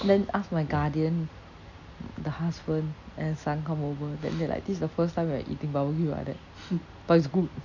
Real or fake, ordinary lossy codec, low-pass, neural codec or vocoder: real; none; 7.2 kHz; none